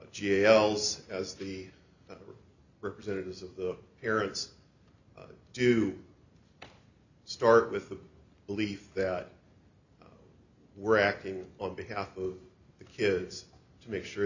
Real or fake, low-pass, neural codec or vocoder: real; 7.2 kHz; none